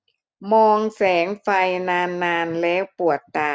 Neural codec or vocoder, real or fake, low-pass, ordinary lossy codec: none; real; none; none